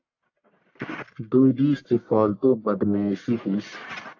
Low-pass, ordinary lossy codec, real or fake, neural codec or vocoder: 7.2 kHz; AAC, 48 kbps; fake; codec, 44.1 kHz, 1.7 kbps, Pupu-Codec